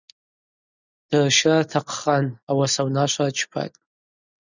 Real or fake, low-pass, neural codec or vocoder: real; 7.2 kHz; none